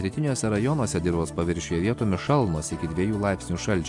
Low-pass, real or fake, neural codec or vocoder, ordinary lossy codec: 10.8 kHz; real; none; AAC, 64 kbps